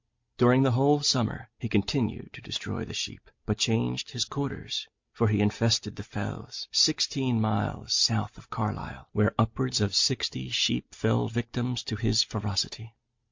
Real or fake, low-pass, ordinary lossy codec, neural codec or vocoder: real; 7.2 kHz; MP3, 48 kbps; none